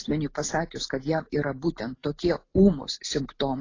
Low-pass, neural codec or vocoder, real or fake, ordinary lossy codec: 7.2 kHz; none; real; AAC, 32 kbps